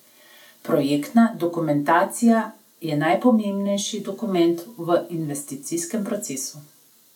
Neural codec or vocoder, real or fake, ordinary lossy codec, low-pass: none; real; none; 19.8 kHz